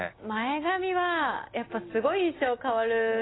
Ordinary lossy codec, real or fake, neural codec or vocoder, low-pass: AAC, 16 kbps; real; none; 7.2 kHz